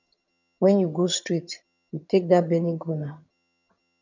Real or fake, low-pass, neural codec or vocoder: fake; 7.2 kHz; vocoder, 22.05 kHz, 80 mel bands, HiFi-GAN